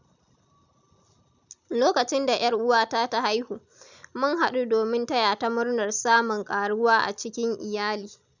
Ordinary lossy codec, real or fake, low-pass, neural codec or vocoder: none; real; 7.2 kHz; none